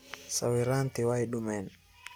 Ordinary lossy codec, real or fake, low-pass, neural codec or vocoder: none; real; none; none